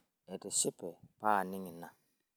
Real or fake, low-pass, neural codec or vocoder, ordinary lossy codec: real; none; none; none